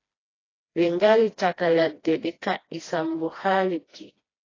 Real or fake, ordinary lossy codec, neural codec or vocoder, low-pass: fake; AAC, 32 kbps; codec, 16 kHz, 1 kbps, FreqCodec, smaller model; 7.2 kHz